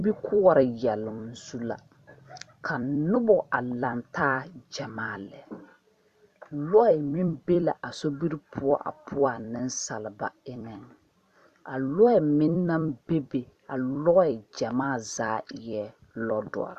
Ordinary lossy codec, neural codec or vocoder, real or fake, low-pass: Opus, 64 kbps; vocoder, 44.1 kHz, 128 mel bands every 256 samples, BigVGAN v2; fake; 14.4 kHz